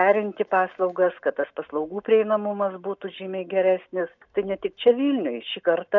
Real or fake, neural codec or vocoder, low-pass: real; none; 7.2 kHz